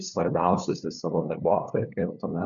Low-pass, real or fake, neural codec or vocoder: 7.2 kHz; fake; codec, 16 kHz, 2 kbps, FunCodec, trained on LibriTTS, 25 frames a second